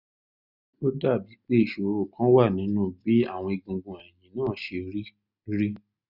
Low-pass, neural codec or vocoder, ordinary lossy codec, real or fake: 5.4 kHz; none; none; real